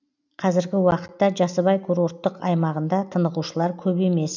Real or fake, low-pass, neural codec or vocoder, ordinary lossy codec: real; 7.2 kHz; none; none